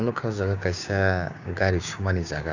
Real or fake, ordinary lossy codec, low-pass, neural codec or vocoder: fake; none; 7.2 kHz; codec, 16 kHz, 6 kbps, DAC